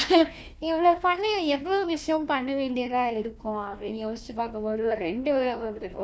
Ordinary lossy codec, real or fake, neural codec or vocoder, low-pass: none; fake; codec, 16 kHz, 1 kbps, FunCodec, trained on Chinese and English, 50 frames a second; none